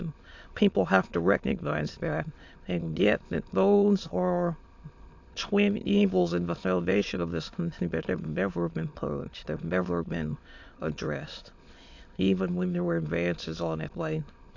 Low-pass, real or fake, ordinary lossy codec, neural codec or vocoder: 7.2 kHz; fake; AAC, 48 kbps; autoencoder, 22.05 kHz, a latent of 192 numbers a frame, VITS, trained on many speakers